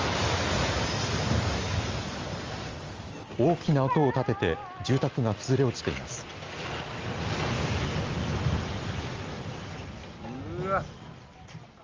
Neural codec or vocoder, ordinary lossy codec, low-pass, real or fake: none; Opus, 32 kbps; 7.2 kHz; real